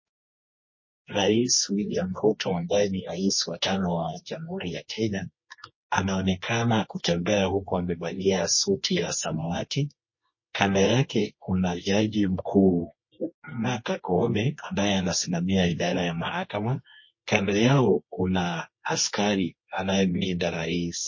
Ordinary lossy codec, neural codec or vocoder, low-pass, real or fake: MP3, 32 kbps; codec, 24 kHz, 0.9 kbps, WavTokenizer, medium music audio release; 7.2 kHz; fake